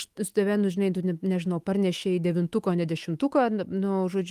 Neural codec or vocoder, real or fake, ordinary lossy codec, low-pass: autoencoder, 48 kHz, 128 numbers a frame, DAC-VAE, trained on Japanese speech; fake; Opus, 24 kbps; 14.4 kHz